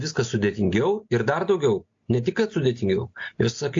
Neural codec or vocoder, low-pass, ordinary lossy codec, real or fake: none; 7.2 kHz; AAC, 48 kbps; real